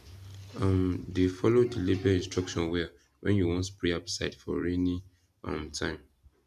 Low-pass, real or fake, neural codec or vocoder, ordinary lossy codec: 14.4 kHz; real; none; none